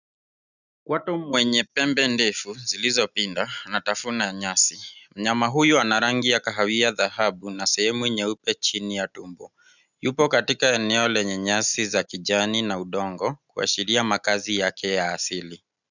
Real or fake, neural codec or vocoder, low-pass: real; none; 7.2 kHz